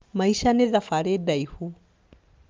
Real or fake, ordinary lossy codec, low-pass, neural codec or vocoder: real; Opus, 24 kbps; 7.2 kHz; none